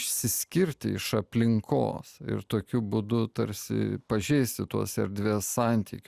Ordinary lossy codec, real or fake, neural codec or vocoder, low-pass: Opus, 64 kbps; real; none; 14.4 kHz